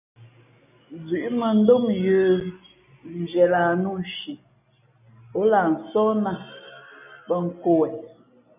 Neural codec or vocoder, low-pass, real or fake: none; 3.6 kHz; real